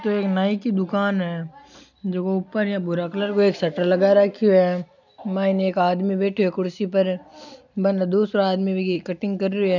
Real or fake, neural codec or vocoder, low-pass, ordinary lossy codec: real; none; 7.2 kHz; none